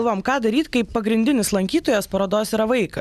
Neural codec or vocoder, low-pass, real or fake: none; 14.4 kHz; real